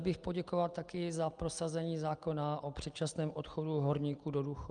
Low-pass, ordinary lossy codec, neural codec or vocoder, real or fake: 9.9 kHz; Opus, 32 kbps; none; real